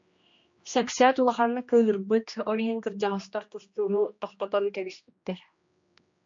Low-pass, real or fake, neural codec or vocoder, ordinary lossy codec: 7.2 kHz; fake; codec, 16 kHz, 1 kbps, X-Codec, HuBERT features, trained on general audio; MP3, 48 kbps